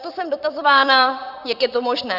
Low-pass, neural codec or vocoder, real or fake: 5.4 kHz; vocoder, 44.1 kHz, 128 mel bands, Pupu-Vocoder; fake